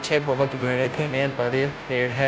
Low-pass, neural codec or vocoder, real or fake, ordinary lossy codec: none; codec, 16 kHz, 0.5 kbps, FunCodec, trained on Chinese and English, 25 frames a second; fake; none